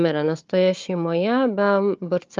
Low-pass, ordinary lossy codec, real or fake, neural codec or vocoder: 7.2 kHz; Opus, 32 kbps; fake; codec, 16 kHz, 4 kbps, FunCodec, trained on Chinese and English, 50 frames a second